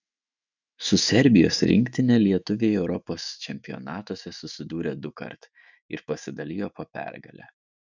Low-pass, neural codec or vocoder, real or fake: 7.2 kHz; autoencoder, 48 kHz, 128 numbers a frame, DAC-VAE, trained on Japanese speech; fake